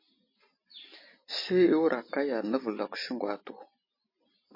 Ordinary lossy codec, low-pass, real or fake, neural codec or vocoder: MP3, 24 kbps; 5.4 kHz; real; none